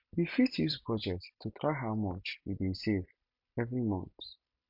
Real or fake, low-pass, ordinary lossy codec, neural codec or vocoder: fake; 5.4 kHz; MP3, 48 kbps; vocoder, 44.1 kHz, 128 mel bands every 256 samples, BigVGAN v2